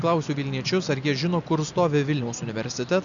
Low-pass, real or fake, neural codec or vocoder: 7.2 kHz; real; none